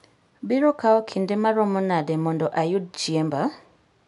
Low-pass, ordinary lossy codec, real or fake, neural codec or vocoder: 10.8 kHz; none; real; none